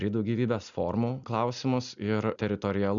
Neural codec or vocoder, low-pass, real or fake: none; 7.2 kHz; real